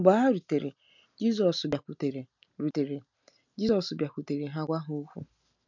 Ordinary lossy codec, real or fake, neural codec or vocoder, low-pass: none; real; none; 7.2 kHz